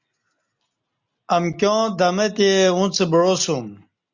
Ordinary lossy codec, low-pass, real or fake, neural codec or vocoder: Opus, 64 kbps; 7.2 kHz; real; none